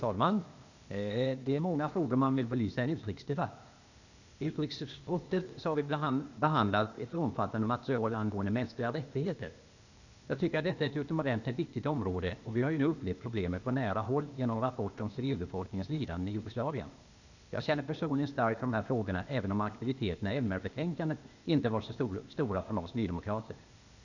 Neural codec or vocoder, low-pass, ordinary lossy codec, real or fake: codec, 16 kHz, 0.8 kbps, ZipCodec; 7.2 kHz; none; fake